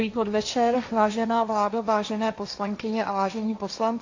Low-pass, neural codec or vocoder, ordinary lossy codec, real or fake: 7.2 kHz; codec, 16 kHz, 1.1 kbps, Voila-Tokenizer; AAC, 48 kbps; fake